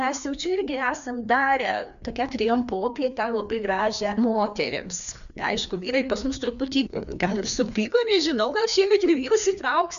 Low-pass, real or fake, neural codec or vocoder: 7.2 kHz; fake; codec, 16 kHz, 2 kbps, FreqCodec, larger model